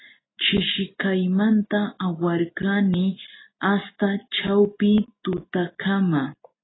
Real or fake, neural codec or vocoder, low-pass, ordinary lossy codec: real; none; 7.2 kHz; AAC, 16 kbps